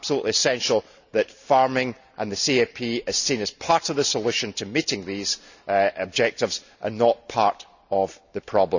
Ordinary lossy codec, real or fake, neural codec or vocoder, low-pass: none; real; none; 7.2 kHz